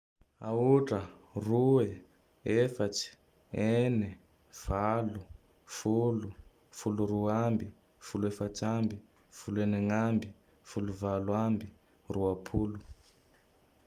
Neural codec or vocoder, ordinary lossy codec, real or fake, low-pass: none; Opus, 32 kbps; real; 14.4 kHz